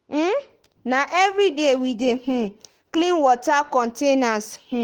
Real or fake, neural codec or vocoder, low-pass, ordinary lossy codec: fake; autoencoder, 48 kHz, 32 numbers a frame, DAC-VAE, trained on Japanese speech; 19.8 kHz; Opus, 16 kbps